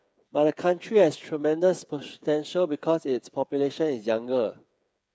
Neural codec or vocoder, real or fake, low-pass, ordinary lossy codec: codec, 16 kHz, 8 kbps, FreqCodec, smaller model; fake; none; none